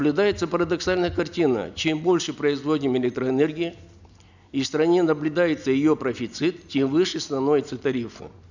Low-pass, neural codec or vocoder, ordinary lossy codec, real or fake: 7.2 kHz; none; none; real